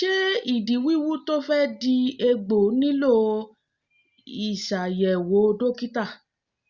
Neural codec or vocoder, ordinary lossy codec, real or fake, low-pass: none; Opus, 64 kbps; real; 7.2 kHz